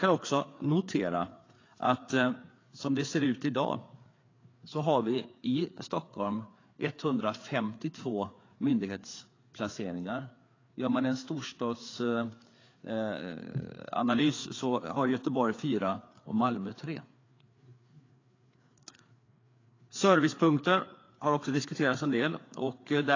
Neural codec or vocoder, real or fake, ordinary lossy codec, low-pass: codec, 16 kHz, 4 kbps, FreqCodec, larger model; fake; AAC, 32 kbps; 7.2 kHz